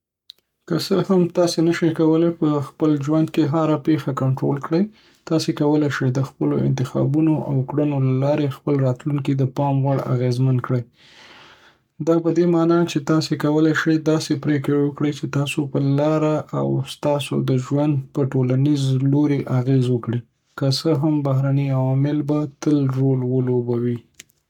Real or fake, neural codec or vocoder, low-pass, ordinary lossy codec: fake; codec, 44.1 kHz, 7.8 kbps, Pupu-Codec; 19.8 kHz; none